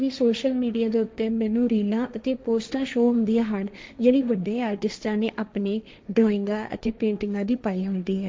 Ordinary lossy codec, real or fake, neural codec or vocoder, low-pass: none; fake; codec, 16 kHz, 1.1 kbps, Voila-Tokenizer; none